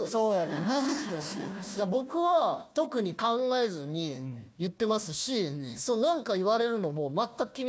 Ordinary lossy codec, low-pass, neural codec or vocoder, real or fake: none; none; codec, 16 kHz, 1 kbps, FunCodec, trained on Chinese and English, 50 frames a second; fake